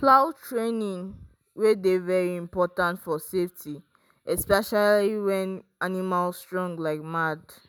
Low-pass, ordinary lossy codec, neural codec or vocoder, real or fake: none; none; none; real